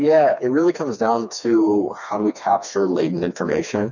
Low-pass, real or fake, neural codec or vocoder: 7.2 kHz; fake; codec, 16 kHz, 2 kbps, FreqCodec, smaller model